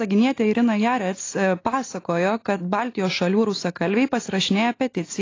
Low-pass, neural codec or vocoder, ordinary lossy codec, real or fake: 7.2 kHz; none; AAC, 32 kbps; real